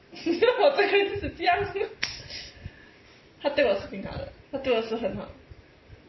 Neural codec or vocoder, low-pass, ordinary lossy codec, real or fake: none; 7.2 kHz; MP3, 24 kbps; real